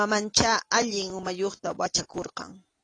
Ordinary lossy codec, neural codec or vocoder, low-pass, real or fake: AAC, 32 kbps; none; 9.9 kHz; real